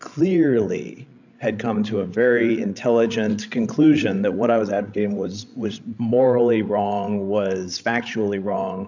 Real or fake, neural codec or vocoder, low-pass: fake; codec, 16 kHz, 16 kbps, FreqCodec, larger model; 7.2 kHz